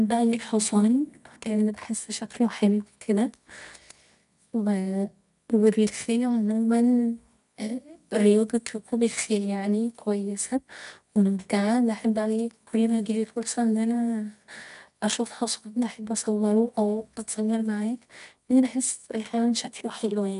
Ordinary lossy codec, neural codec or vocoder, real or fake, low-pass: none; codec, 24 kHz, 0.9 kbps, WavTokenizer, medium music audio release; fake; 10.8 kHz